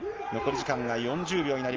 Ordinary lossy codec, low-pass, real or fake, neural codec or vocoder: Opus, 24 kbps; 7.2 kHz; real; none